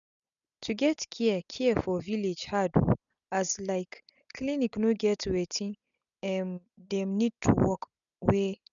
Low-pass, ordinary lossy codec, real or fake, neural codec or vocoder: 7.2 kHz; none; real; none